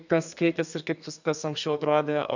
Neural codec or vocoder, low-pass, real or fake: codec, 32 kHz, 1.9 kbps, SNAC; 7.2 kHz; fake